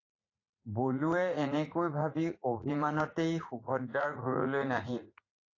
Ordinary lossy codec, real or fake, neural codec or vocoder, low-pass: AAC, 32 kbps; fake; vocoder, 44.1 kHz, 80 mel bands, Vocos; 7.2 kHz